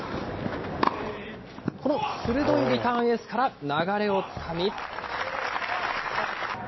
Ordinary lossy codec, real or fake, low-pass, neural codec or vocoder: MP3, 24 kbps; real; 7.2 kHz; none